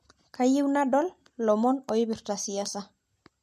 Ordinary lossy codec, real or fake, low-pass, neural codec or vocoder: MP3, 64 kbps; real; 14.4 kHz; none